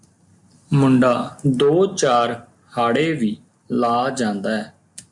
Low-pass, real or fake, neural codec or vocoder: 10.8 kHz; real; none